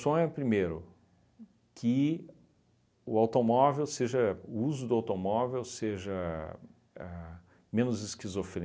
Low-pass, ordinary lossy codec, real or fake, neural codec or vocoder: none; none; real; none